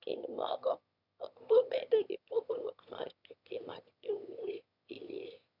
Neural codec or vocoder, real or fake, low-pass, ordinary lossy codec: autoencoder, 22.05 kHz, a latent of 192 numbers a frame, VITS, trained on one speaker; fake; 5.4 kHz; none